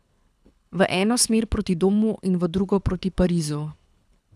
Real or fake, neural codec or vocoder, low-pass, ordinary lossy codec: fake; codec, 24 kHz, 6 kbps, HILCodec; none; none